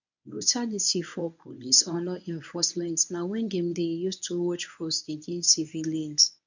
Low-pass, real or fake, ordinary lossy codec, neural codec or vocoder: 7.2 kHz; fake; none; codec, 24 kHz, 0.9 kbps, WavTokenizer, medium speech release version 1